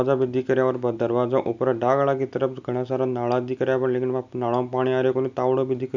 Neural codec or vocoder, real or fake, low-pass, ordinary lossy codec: none; real; 7.2 kHz; none